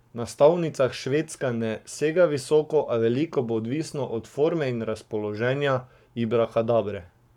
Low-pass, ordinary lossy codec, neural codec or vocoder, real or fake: 19.8 kHz; none; codec, 44.1 kHz, 7.8 kbps, Pupu-Codec; fake